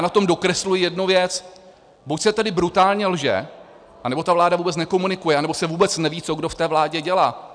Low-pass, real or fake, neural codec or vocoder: 9.9 kHz; real; none